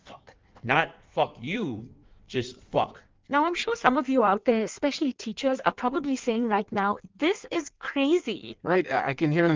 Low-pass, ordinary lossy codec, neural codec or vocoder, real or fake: 7.2 kHz; Opus, 24 kbps; codec, 16 kHz in and 24 kHz out, 1.1 kbps, FireRedTTS-2 codec; fake